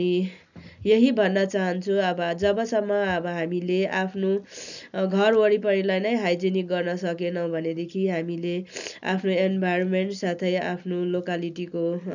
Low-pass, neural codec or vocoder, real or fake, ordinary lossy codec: 7.2 kHz; none; real; none